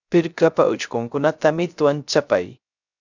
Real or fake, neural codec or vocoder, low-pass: fake; codec, 16 kHz, 0.3 kbps, FocalCodec; 7.2 kHz